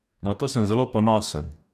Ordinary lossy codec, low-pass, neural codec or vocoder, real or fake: none; 14.4 kHz; codec, 44.1 kHz, 2.6 kbps, DAC; fake